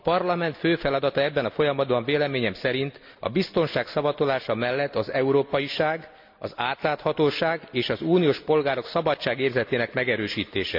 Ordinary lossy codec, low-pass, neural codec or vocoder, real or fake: MP3, 48 kbps; 5.4 kHz; none; real